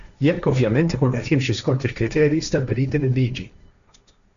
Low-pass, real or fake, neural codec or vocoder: 7.2 kHz; fake; codec, 16 kHz, 1.1 kbps, Voila-Tokenizer